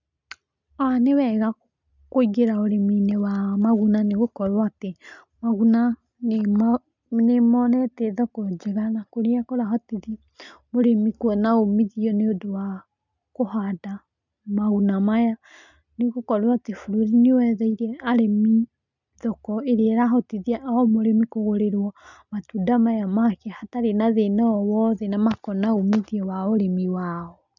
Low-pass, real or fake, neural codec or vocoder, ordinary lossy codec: 7.2 kHz; real; none; none